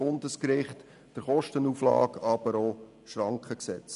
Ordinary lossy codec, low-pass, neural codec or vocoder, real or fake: none; 10.8 kHz; none; real